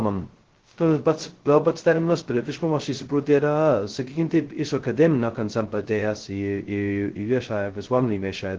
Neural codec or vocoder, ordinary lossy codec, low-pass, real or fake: codec, 16 kHz, 0.2 kbps, FocalCodec; Opus, 16 kbps; 7.2 kHz; fake